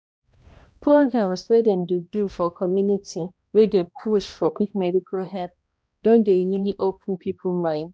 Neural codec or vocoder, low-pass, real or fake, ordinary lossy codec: codec, 16 kHz, 1 kbps, X-Codec, HuBERT features, trained on balanced general audio; none; fake; none